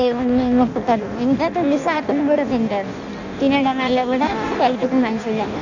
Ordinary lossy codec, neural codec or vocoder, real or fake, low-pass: none; codec, 16 kHz in and 24 kHz out, 0.6 kbps, FireRedTTS-2 codec; fake; 7.2 kHz